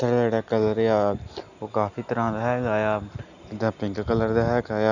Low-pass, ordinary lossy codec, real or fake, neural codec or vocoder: 7.2 kHz; none; real; none